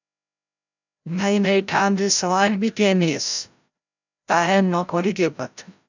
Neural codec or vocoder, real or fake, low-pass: codec, 16 kHz, 0.5 kbps, FreqCodec, larger model; fake; 7.2 kHz